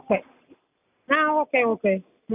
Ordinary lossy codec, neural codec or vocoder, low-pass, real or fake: none; none; 3.6 kHz; real